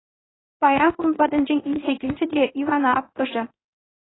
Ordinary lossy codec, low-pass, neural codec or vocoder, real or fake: AAC, 16 kbps; 7.2 kHz; codec, 16 kHz in and 24 kHz out, 1 kbps, XY-Tokenizer; fake